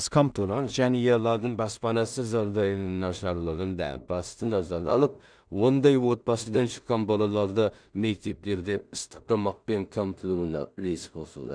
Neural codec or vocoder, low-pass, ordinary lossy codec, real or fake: codec, 16 kHz in and 24 kHz out, 0.4 kbps, LongCat-Audio-Codec, two codebook decoder; 9.9 kHz; none; fake